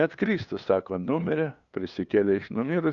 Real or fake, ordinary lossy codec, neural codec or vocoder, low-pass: fake; Opus, 64 kbps; codec, 16 kHz, 2 kbps, FunCodec, trained on LibriTTS, 25 frames a second; 7.2 kHz